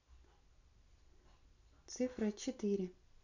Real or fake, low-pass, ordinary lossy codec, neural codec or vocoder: real; 7.2 kHz; none; none